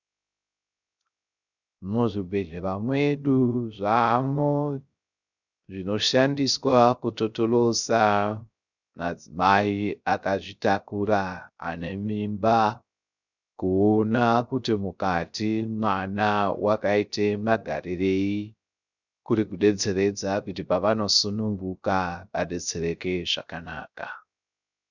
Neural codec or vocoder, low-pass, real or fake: codec, 16 kHz, 0.3 kbps, FocalCodec; 7.2 kHz; fake